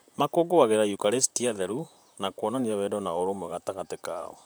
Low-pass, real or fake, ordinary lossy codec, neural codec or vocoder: none; fake; none; vocoder, 44.1 kHz, 128 mel bands, Pupu-Vocoder